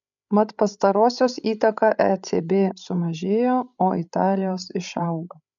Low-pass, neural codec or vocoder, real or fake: 7.2 kHz; codec, 16 kHz, 16 kbps, FreqCodec, larger model; fake